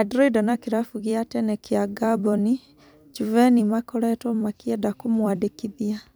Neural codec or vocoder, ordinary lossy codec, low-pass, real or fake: vocoder, 44.1 kHz, 128 mel bands every 256 samples, BigVGAN v2; none; none; fake